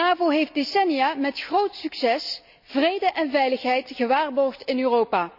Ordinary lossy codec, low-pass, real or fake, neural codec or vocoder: none; 5.4 kHz; real; none